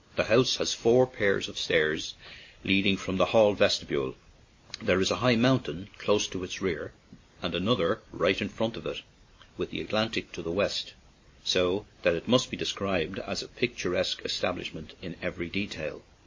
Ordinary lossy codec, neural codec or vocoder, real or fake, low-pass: MP3, 32 kbps; none; real; 7.2 kHz